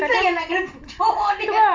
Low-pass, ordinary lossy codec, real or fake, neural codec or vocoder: 7.2 kHz; Opus, 32 kbps; real; none